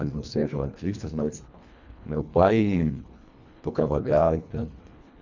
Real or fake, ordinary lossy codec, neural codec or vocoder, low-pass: fake; none; codec, 24 kHz, 1.5 kbps, HILCodec; 7.2 kHz